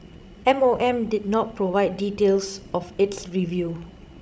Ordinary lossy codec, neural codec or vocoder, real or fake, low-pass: none; codec, 16 kHz, 16 kbps, FreqCodec, larger model; fake; none